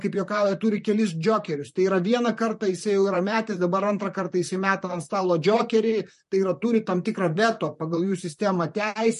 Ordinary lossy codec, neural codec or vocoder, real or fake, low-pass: MP3, 48 kbps; vocoder, 44.1 kHz, 128 mel bands, Pupu-Vocoder; fake; 14.4 kHz